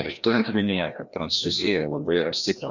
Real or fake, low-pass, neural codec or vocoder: fake; 7.2 kHz; codec, 16 kHz, 1 kbps, FreqCodec, larger model